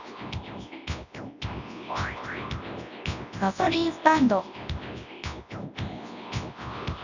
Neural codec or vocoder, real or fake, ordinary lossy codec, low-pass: codec, 24 kHz, 0.9 kbps, WavTokenizer, large speech release; fake; none; 7.2 kHz